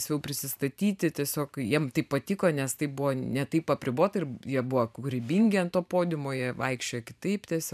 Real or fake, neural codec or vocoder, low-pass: fake; vocoder, 44.1 kHz, 128 mel bands every 512 samples, BigVGAN v2; 14.4 kHz